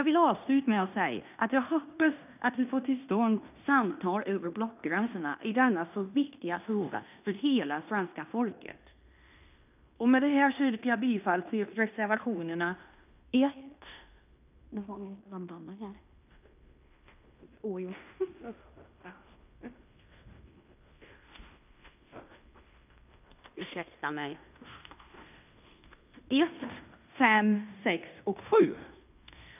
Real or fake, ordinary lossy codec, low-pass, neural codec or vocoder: fake; none; 3.6 kHz; codec, 16 kHz in and 24 kHz out, 0.9 kbps, LongCat-Audio-Codec, fine tuned four codebook decoder